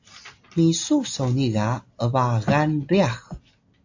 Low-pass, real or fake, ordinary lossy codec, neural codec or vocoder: 7.2 kHz; real; AAC, 48 kbps; none